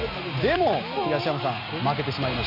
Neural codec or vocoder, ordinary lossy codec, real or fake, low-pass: none; none; real; 5.4 kHz